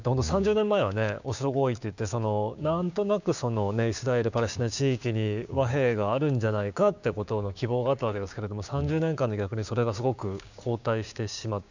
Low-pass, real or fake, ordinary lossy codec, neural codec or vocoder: 7.2 kHz; fake; none; codec, 16 kHz, 6 kbps, DAC